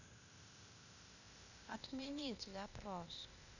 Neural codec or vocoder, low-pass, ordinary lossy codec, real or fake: codec, 16 kHz, 0.8 kbps, ZipCodec; 7.2 kHz; none; fake